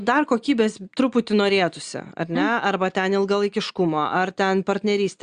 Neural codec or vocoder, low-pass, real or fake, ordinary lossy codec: none; 9.9 kHz; real; Opus, 64 kbps